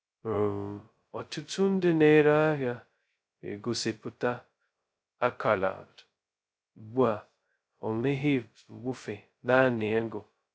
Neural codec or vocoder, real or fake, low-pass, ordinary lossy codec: codec, 16 kHz, 0.2 kbps, FocalCodec; fake; none; none